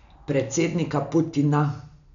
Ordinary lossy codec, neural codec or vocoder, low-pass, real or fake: none; none; 7.2 kHz; real